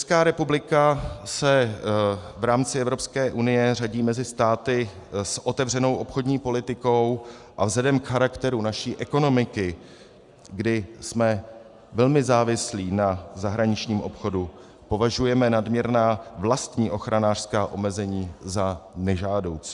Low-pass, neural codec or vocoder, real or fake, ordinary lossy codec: 10.8 kHz; none; real; Opus, 64 kbps